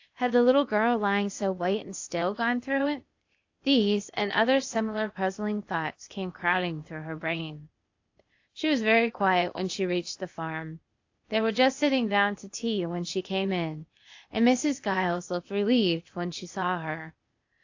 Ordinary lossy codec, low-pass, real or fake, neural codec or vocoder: AAC, 48 kbps; 7.2 kHz; fake; codec, 16 kHz, 0.8 kbps, ZipCodec